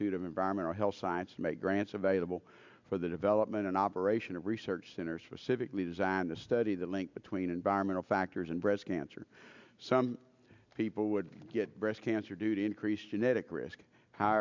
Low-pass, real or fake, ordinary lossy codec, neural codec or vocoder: 7.2 kHz; real; MP3, 64 kbps; none